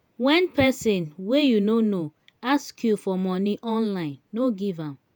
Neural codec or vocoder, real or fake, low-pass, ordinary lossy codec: vocoder, 48 kHz, 128 mel bands, Vocos; fake; none; none